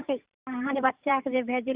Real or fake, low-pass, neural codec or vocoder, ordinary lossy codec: fake; 3.6 kHz; vocoder, 44.1 kHz, 128 mel bands every 512 samples, BigVGAN v2; Opus, 64 kbps